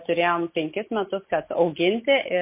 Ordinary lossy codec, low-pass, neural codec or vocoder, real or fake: MP3, 24 kbps; 3.6 kHz; none; real